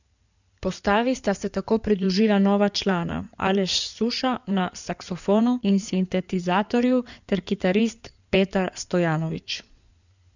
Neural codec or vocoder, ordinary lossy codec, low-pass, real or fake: codec, 16 kHz in and 24 kHz out, 2.2 kbps, FireRedTTS-2 codec; none; 7.2 kHz; fake